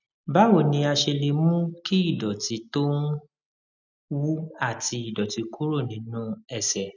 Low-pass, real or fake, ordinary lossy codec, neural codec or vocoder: 7.2 kHz; real; none; none